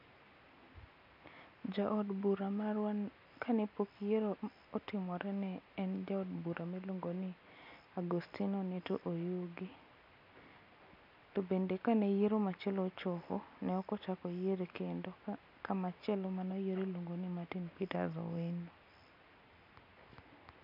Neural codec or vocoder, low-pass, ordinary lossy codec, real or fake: none; 5.4 kHz; none; real